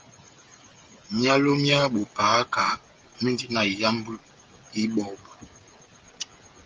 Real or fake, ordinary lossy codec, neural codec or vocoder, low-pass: fake; Opus, 32 kbps; codec, 16 kHz, 16 kbps, FreqCodec, smaller model; 7.2 kHz